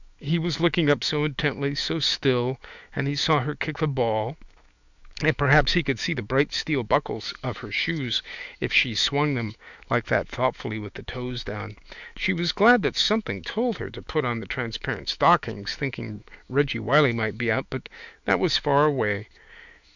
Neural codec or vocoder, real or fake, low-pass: autoencoder, 48 kHz, 128 numbers a frame, DAC-VAE, trained on Japanese speech; fake; 7.2 kHz